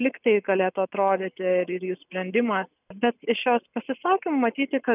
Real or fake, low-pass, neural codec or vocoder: real; 3.6 kHz; none